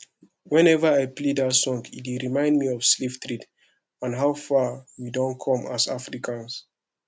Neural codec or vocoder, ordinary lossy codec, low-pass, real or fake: none; none; none; real